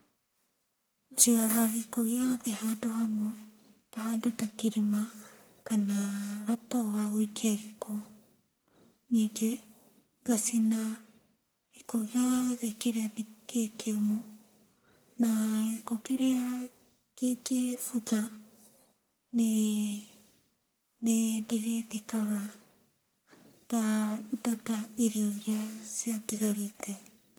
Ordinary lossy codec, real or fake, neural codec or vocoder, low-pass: none; fake; codec, 44.1 kHz, 1.7 kbps, Pupu-Codec; none